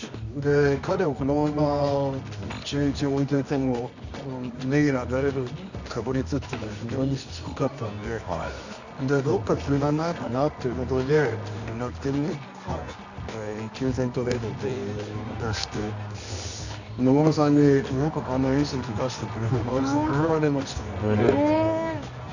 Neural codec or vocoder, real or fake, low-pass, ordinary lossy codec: codec, 24 kHz, 0.9 kbps, WavTokenizer, medium music audio release; fake; 7.2 kHz; none